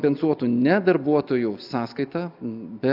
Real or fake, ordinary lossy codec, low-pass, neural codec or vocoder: real; AAC, 48 kbps; 5.4 kHz; none